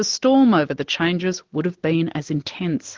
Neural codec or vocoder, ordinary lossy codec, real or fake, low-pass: none; Opus, 16 kbps; real; 7.2 kHz